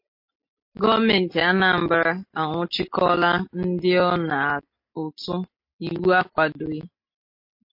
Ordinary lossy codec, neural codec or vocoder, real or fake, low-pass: MP3, 32 kbps; none; real; 5.4 kHz